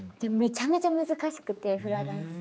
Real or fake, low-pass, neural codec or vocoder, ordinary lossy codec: fake; none; codec, 16 kHz, 4 kbps, X-Codec, HuBERT features, trained on general audio; none